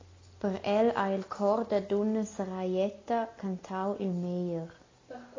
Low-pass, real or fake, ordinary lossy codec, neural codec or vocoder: 7.2 kHz; real; AAC, 32 kbps; none